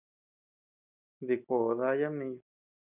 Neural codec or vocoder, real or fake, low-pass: none; real; 3.6 kHz